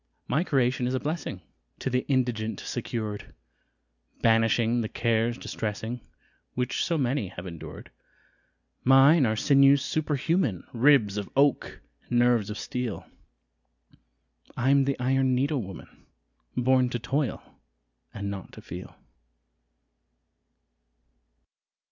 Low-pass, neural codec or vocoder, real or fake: 7.2 kHz; none; real